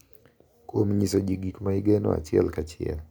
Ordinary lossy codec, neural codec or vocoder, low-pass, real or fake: none; none; none; real